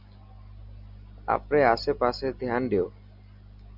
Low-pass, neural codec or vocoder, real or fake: 5.4 kHz; none; real